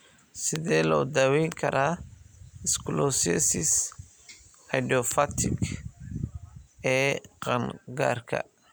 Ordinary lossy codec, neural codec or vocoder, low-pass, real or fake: none; none; none; real